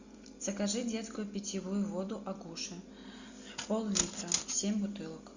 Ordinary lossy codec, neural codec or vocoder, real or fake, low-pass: Opus, 64 kbps; none; real; 7.2 kHz